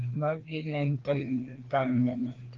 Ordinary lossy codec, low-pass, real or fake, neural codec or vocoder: Opus, 16 kbps; 7.2 kHz; fake; codec, 16 kHz, 2 kbps, FreqCodec, larger model